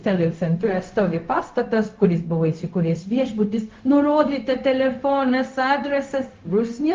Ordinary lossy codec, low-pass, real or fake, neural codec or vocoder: Opus, 32 kbps; 7.2 kHz; fake; codec, 16 kHz, 0.4 kbps, LongCat-Audio-Codec